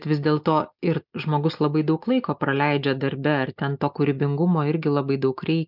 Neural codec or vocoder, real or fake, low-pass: none; real; 5.4 kHz